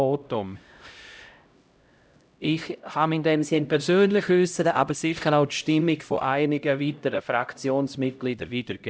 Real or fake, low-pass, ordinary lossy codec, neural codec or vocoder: fake; none; none; codec, 16 kHz, 0.5 kbps, X-Codec, HuBERT features, trained on LibriSpeech